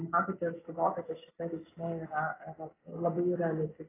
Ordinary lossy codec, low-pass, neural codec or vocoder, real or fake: AAC, 16 kbps; 3.6 kHz; none; real